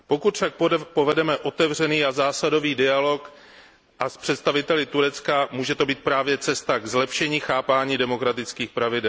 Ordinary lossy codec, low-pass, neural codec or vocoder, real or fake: none; none; none; real